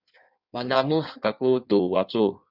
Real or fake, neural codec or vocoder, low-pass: fake; codec, 16 kHz in and 24 kHz out, 1.1 kbps, FireRedTTS-2 codec; 5.4 kHz